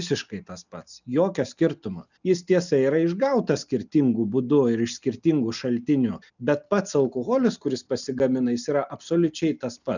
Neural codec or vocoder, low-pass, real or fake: none; 7.2 kHz; real